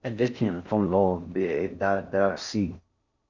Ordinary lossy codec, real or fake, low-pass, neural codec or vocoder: Opus, 64 kbps; fake; 7.2 kHz; codec, 16 kHz in and 24 kHz out, 0.6 kbps, FocalCodec, streaming, 4096 codes